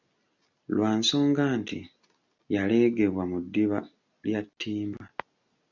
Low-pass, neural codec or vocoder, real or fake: 7.2 kHz; none; real